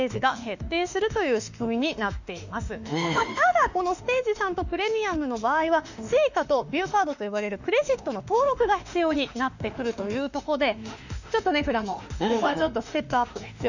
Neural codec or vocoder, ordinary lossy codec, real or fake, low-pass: autoencoder, 48 kHz, 32 numbers a frame, DAC-VAE, trained on Japanese speech; none; fake; 7.2 kHz